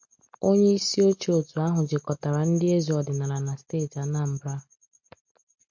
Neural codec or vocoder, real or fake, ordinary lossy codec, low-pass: none; real; MP3, 48 kbps; 7.2 kHz